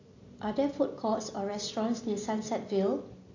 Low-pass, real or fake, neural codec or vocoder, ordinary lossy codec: 7.2 kHz; real; none; AAC, 48 kbps